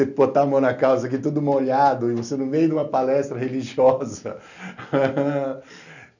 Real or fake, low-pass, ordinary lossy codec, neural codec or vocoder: real; 7.2 kHz; none; none